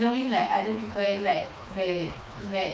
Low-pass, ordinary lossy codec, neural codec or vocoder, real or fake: none; none; codec, 16 kHz, 2 kbps, FreqCodec, smaller model; fake